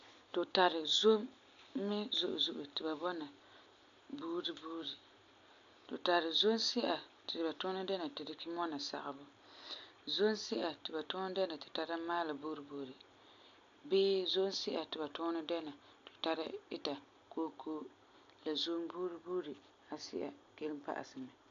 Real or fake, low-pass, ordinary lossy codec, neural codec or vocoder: real; 7.2 kHz; MP3, 64 kbps; none